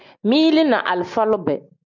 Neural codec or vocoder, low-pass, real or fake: none; 7.2 kHz; real